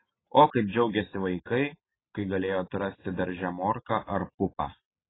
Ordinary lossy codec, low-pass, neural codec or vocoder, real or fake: AAC, 16 kbps; 7.2 kHz; none; real